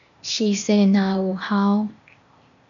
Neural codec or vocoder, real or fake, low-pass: codec, 16 kHz, 0.8 kbps, ZipCodec; fake; 7.2 kHz